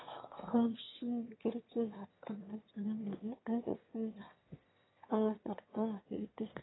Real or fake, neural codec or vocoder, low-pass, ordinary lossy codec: fake; autoencoder, 22.05 kHz, a latent of 192 numbers a frame, VITS, trained on one speaker; 7.2 kHz; AAC, 16 kbps